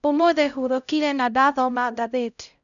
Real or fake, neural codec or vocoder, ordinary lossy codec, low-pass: fake; codec, 16 kHz, 0.5 kbps, X-Codec, HuBERT features, trained on LibriSpeech; none; 7.2 kHz